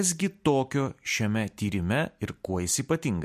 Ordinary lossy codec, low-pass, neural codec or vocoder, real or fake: MP3, 64 kbps; 14.4 kHz; none; real